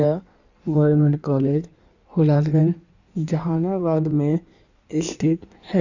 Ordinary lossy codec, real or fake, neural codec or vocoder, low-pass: none; fake; codec, 16 kHz in and 24 kHz out, 1.1 kbps, FireRedTTS-2 codec; 7.2 kHz